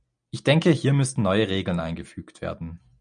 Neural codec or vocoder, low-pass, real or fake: none; 9.9 kHz; real